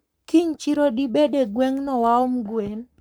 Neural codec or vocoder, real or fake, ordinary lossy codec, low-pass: codec, 44.1 kHz, 7.8 kbps, Pupu-Codec; fake; none; none